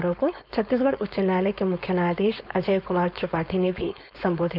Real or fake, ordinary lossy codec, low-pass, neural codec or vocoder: fake; none; 5.4 kHz; codec, 16 kHz, 4.8 kbps, FACodec